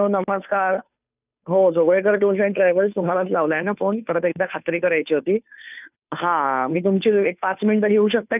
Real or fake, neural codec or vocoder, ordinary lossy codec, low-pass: fake; codec, 16 kHz, 2 kbps, FunCodec, trained on Chinese and English, 25 frames a second; none; 3.6 kHz